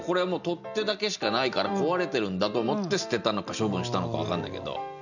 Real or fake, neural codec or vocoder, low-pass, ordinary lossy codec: real; none; 7.2 kHz; none